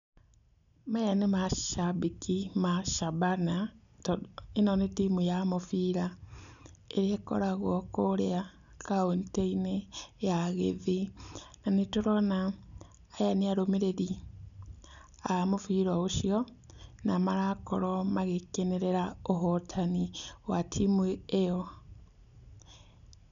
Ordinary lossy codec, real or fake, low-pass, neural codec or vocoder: none; real; 7.2 kHz; none